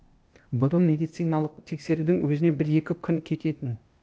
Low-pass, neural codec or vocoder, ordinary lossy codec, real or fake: none; codec, 16 kHz, 0.8 kbps, ZipCodec; none; fake